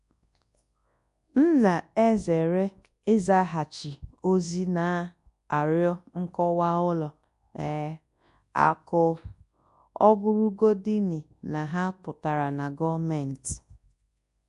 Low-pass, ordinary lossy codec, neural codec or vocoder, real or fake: 10.8 kHz; AAC, 64 kbps; codec, 24 kHz, 0.9 kbps, WavTokenizer, large speech release; fake